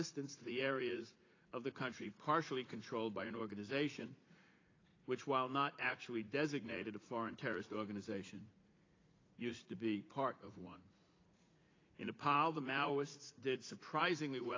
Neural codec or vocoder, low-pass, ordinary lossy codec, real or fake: vocoder, 44.1 kHz, 80 mel bands, Vocos; 7.2 kHz; AAC, 32 kbps; fake